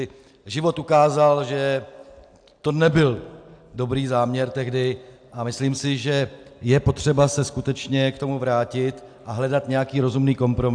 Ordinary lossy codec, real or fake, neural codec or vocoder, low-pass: AAC, 64 kbps; real; none; 9.9 kHz